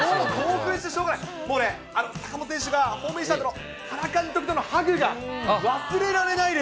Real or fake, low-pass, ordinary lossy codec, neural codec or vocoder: real; none; none; none